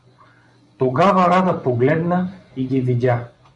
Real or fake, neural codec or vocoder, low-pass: fake; vocoder, 24 kHz, 100 mel bands, Vocos; 10.8 kHz